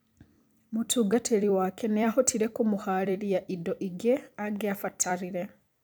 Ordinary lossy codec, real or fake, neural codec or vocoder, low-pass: none; fake; vocoder, 44.1 kHz, 128 mel bands every 256 samples, BigVGAN v2; none